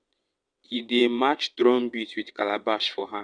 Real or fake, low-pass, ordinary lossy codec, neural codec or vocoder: fake; 9.9 kHz; none; vocoder, 22.05 kHz, 80 mel bands, WaveNeXt